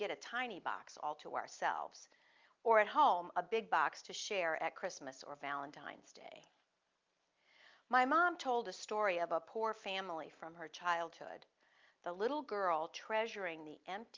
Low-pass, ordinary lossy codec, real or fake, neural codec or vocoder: 7.2 kHz; Opus, 24 kbps; real; none